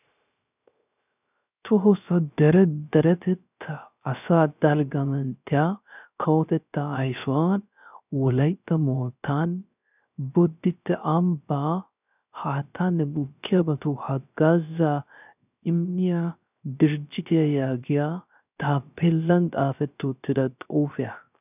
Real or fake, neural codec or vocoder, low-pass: fake; codec, 16 kHz, 0.3 kbps, FocalCodec; 3.6 kHz